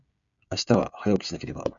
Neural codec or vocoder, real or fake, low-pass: codec, 16 kHz, 16 kbps, FreqCodec, smaller model; fake; 7.2 kHz